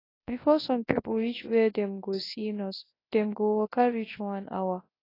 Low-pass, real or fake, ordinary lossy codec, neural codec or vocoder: 5.4 kHz; fake; AAC, 24 kbps; codec, 24 kHz, 0.9 kbps, WavTokenizer, large speech release